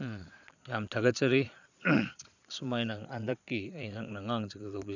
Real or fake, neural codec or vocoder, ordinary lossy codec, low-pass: fake; vocoder, 22.05 kHz, 80 mel bands, Vocos; none; 7.2 kHz